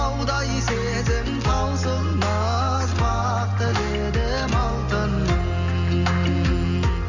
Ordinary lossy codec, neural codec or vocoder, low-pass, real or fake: none; none; 7.2 kHz; real